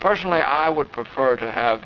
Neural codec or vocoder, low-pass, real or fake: vocoder, 22.05 kHz, 80 mel bands, WaveNeXt; 7.2 kHz; fake